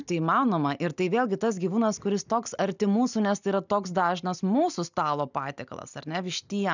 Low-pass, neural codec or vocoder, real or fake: 7.2 kHz; none; real